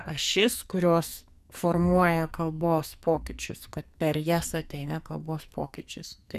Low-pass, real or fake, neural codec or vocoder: 14.4 kHz; fake; codec, 44.1 kHz, 2.6 kbps, SNAC